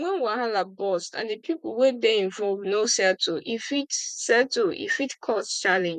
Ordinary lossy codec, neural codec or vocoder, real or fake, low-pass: none; vocoder, 44.1 kHz, 128 mel bands, Pupu-Vocoder; fake; 14.4 kHz